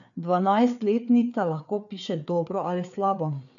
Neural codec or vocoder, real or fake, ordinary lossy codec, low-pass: codec, 16 kHz, 4 kbps, FreqCodec, larger model; fake; none; 7.2 kHz